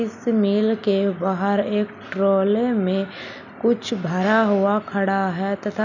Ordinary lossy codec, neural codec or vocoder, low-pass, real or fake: none; none; 7.2 kHz; real